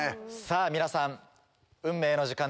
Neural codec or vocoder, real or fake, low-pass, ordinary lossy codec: none; real; none; none